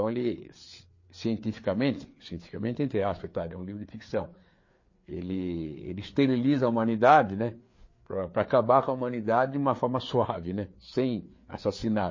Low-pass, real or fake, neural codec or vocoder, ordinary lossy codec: 7.2 kHz; fake; codec, 16 kHz, 4 kbps, FreqCodec, larger model; MP3, 32 kbps